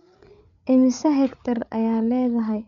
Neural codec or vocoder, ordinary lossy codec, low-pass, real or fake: codec, 16 kHz, 8 kbps, FreqCodec, larger model; none; 7.2 kHz; fake